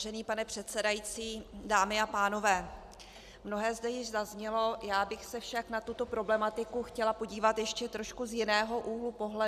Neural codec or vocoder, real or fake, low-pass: none; real; 14.4 kHz